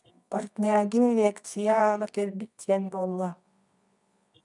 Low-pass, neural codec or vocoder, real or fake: 10.8 kHz; codec, 24 kHz, 0.9 kbps, WavTokenizer, medium music audio release; fake